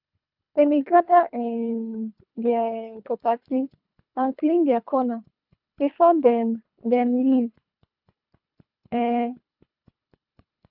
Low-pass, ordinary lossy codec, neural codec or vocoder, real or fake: 5.4 kHz; none; codec, 24 kHz, 3 kbps, HILCodec; fake